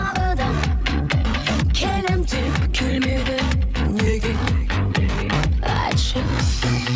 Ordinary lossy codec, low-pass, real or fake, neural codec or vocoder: none; none; fake; codec, 16 kHz, 8 kbps, FreqCodec, larger model